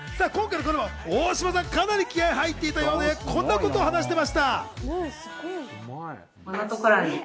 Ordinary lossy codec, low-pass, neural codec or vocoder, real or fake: none; none; none; real